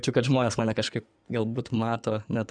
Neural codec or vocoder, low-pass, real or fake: codec, 16 kHz in and 24 kHz out, 2.2 kbps, FireRedTTS-2 codec; 9.9 kHz; fake